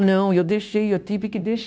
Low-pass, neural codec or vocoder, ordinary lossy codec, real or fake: none; codec, 16 kHz, 0.9 kbps, LongCat-Audio-Codec; none; fake